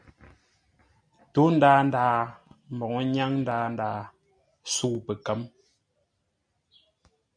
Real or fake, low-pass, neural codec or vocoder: real; 9.9 kHz; none